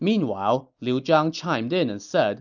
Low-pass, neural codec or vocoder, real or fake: 7.2 kHz; none; real